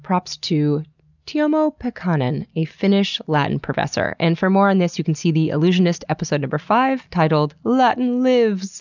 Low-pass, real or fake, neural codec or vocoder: 7.2 kHz; real; none